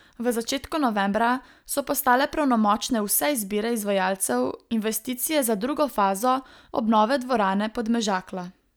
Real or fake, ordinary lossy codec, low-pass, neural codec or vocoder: real; none; none; none